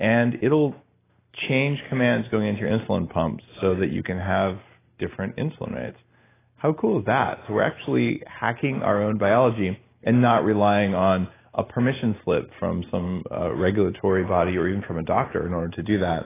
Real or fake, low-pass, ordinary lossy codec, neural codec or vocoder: real; 3.6 kHz; AAC, 16 kbps; none